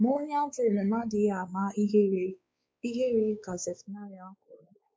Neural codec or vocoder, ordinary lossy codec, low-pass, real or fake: codec, 16 kHz, 4 kbps, X-Codec, WavLM features, trained on Multilingual LibriSpeech; none; none; fake